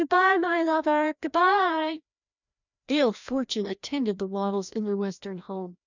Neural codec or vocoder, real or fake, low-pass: codec, 16 kHz, 1 kbps, FreqCodec, larger model; fake; 7.2 kHz